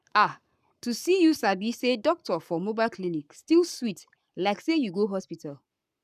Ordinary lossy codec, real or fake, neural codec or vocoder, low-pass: none; fake; codec, 44.1 kHz, 7.8 kbps, Pupu-Codec; 14.4 kHz